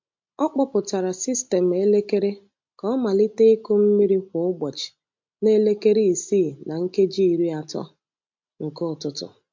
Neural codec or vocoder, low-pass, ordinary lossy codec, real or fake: none; 7.2 kHz; MP3, 48 kbps; real